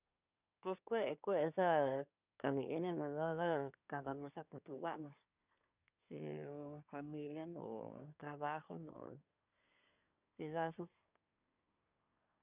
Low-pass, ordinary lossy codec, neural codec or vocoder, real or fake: 3.6 kHz; none; codec, 24 kHz, 1 kbps, SNAC; fake